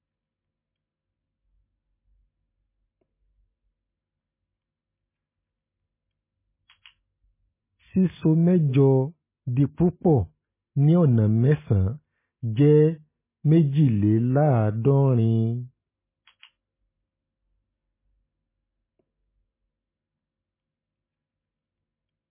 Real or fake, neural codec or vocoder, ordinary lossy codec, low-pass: real; none; MP3, 16 kbps; 3.6 kHz